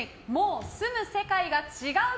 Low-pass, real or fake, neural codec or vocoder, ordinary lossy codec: none; real; none; none